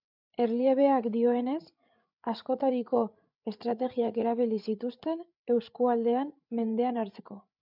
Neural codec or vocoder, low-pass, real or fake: codec, 16 kHz, 8 kbps, FreqCodec, larger model; 5.4 kHz; fake